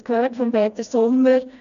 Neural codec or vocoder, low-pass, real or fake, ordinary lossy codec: codec, 16 kHz, 1 kbps, FreqCodec, smaller model; 7.2 kHz; fake; none